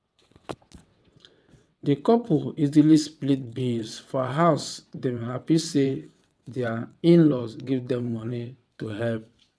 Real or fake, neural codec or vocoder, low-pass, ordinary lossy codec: fake; vocoder, 22.05 kHz, 80 mel bands, WaveNeXt; none; none